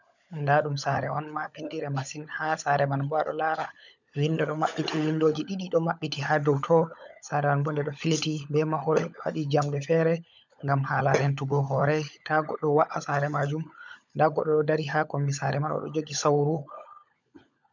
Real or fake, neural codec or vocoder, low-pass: fake; codec, 16 kHz, 16 kbps, FunCodec, trained on LibriTTS, 50 frames a second; 7.2 kHz